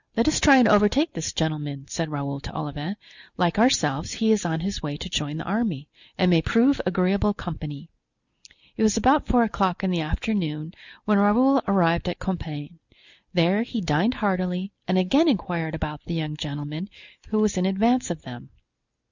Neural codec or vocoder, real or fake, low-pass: none; real; 7.2 kHz